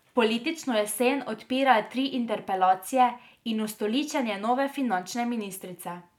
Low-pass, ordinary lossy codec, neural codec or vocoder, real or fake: 19.8 kHz; none; none; real